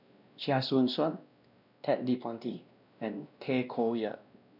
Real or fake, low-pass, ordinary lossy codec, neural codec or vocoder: fake; 5.4 kHz; none; codec, 16 kHz, 1 kbps, X-Codec, WavLM features, trained on Multilingual LibriSpeech